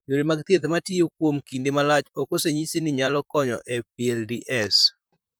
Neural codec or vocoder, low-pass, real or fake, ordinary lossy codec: vocoder, 44.1 kHz, 128 mel bands, Pupu-Vocoder; none; fake; none